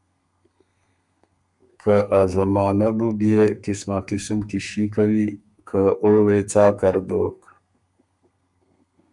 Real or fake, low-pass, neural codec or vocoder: fake; 10.8 kHz; codec, 32 kHz, 1.9 kbps, SNAC